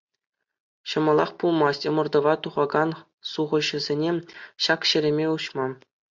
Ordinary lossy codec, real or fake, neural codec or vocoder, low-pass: MP3, 64 kbps; real; none; 7.2 kHz